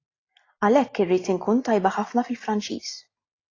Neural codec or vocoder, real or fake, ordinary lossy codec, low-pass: none; real; AAC, 32 kbps; 7.2 kHz